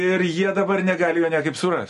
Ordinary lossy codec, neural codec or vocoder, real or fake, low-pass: MP3, 48 kbps; none; real; 14.4 kHz